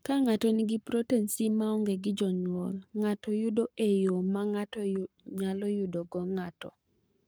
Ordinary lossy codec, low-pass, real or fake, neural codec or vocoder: none; none; fake; codec, 44.1 kHz, 7.8 kbps, Pupu-Codec